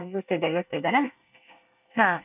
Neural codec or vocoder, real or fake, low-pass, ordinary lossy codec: codec, 24 kHz, 1 kbps, SNAC; fake; 3.6 kHz; none